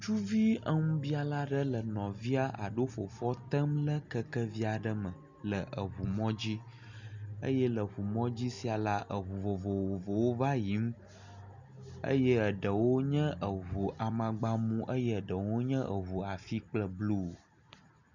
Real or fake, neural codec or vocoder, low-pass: real; none; 7.2 kHz